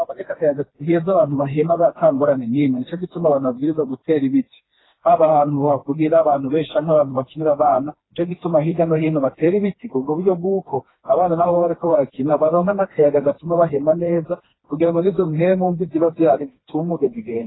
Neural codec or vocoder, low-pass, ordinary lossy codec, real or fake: codec, 16 kHz, 2 kbps, FreqCodec, smaller model; 7.2 kHz; AAC, 16 kbps; fake